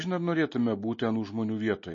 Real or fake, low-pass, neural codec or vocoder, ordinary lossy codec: real; 7.2 kHz; none; MP3, 32 kbps